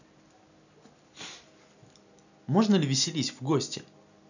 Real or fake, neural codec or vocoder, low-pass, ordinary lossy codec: real; none; 7.2 kHz; none